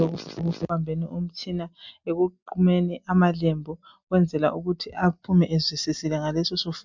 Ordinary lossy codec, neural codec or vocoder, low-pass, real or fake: MP3, 64 kbps; none; 7.2 kHz; real